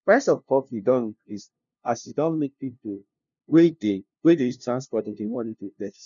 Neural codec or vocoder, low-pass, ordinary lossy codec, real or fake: codec, 16 kHz, 0.5 kbps, FunCodec, trained on LibriTTS, 25 frames a second; 7.2 kHz; none; fake